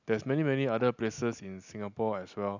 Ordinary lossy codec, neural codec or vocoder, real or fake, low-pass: none; none; real; 7.2 kHz